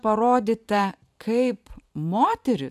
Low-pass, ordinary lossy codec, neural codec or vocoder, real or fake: 14.4 kHz; AAC, 96 kbps; vocoder, 44.1 kHz, 128 mel bands every 512 samples, BigVGAN v2; fake